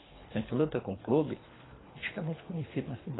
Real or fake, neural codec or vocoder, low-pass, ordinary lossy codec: fake; codec, 24 kHz, 3 kbps, HILCodec; 7.2 kHz; AAC, 16 kbps